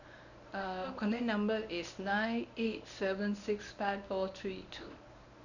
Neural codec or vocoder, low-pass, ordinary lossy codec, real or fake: codec, 24 kHz, 0.9 kbps, WavTokenizer, medium speech release version 1; 7.2 kHz; none; fake